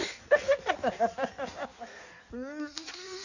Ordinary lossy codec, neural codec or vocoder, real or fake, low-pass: none; codec, 16 kHz in and 24 kHz out, 1.1 kbps, FireRedTTS-2 codec; fake; 7.2 kHz